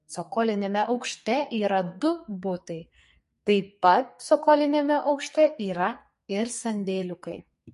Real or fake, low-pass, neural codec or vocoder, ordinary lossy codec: fake; 14.4 kHz; codec, 44.1 kHz, 2.6 kbps, SNAC; MP3, 48 kbps